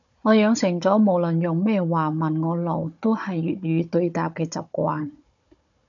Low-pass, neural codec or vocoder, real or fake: 7.2 kHz; codec, 16 kHz, 16 kbps, FunCodec, trained on Chinese and English, 50 frames a second; fake